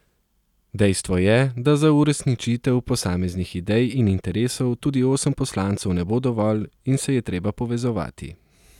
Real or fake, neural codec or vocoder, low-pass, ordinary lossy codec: real; none; 19.8 kHz; none